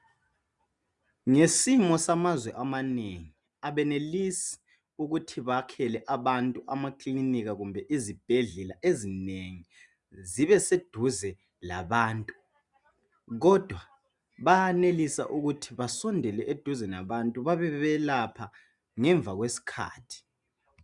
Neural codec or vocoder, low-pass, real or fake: none; 10.8 kHz; real